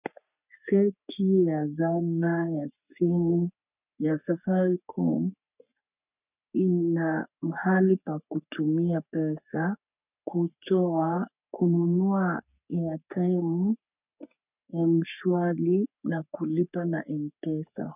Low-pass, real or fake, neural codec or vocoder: 3.6 kHz; fake; codec, 44.1 kHz, 3.4 kbps, Pupu-Codec